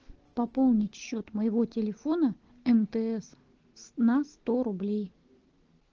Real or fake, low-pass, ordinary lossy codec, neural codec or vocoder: real; 7.2 kHz; Opus, 16 kbps; none